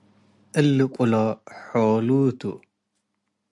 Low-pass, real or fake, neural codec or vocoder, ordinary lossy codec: 10.8 kHz; real; none; AAC, 64 kbps